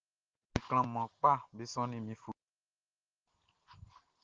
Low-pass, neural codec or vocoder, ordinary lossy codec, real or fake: 7.2 kHz; none; Opus, 16 kbps; real